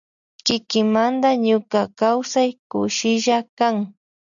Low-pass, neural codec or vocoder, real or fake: 7.2 kHz; none; real